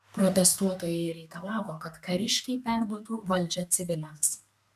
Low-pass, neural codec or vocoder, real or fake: 14.4 kHz; codec, 32 kHz, 1.9 kbps, SNAC; fake